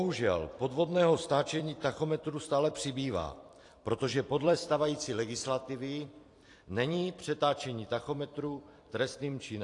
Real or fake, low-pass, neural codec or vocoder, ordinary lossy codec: fake; 10.8 kHz; vocoder, 44.1 kHz, 128 mel bands every 256 samples, BigVGAN v2; AAC, 48 kbps